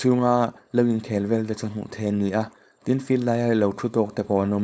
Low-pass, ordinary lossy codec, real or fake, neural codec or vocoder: none; none; fake; codec, 16 kHz, 4.8 kbps, FACodec